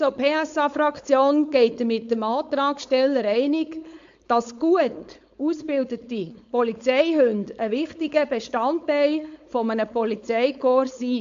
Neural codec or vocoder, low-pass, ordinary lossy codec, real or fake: codec, 16 kHz, 4.8 kbps, FACodec; 7.2 kHz; AAC, 64 kbps; fake